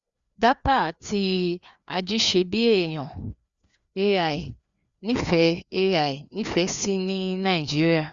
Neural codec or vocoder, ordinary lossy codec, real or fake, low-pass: codec, 16 kHz, 2 kbps, FreqCodec, larger model; Opus, 64 kbps; fake; 7.2 kHz